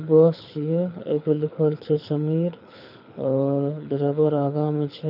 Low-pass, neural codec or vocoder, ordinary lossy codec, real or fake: 5.4 kHz; codec, 24 kHz, 6 kbps, HILCodec; none; fake